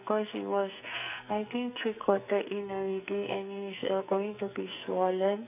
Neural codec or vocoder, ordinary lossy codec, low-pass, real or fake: codec, 44.1 kHz, 2.6 kbps, SNAC; none; 3.6 kHz; fake